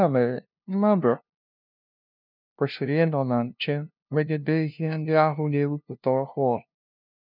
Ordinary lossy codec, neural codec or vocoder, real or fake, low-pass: none; codec, 16 kHz, 0.5 kbps, FunCodec, trained on LibriTTS, 25 frames a second; fake; 5.4 kHz